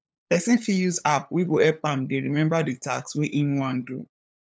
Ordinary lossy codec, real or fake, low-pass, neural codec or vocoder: none; fake; none; codec, 16 kHz, 8 kbps, FunCodec, trained on LibriTTS, 25 frames a second